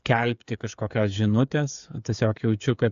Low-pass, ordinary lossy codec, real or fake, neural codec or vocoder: 7.2 kHz; AAC, 96 kbps; fake; codec, 16 kHz, 8 kbps, FreqCodec, smaller model